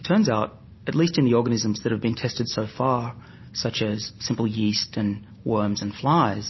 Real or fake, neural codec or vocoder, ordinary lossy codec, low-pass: real; none; MP3, 24 kbps; 7.2 kHz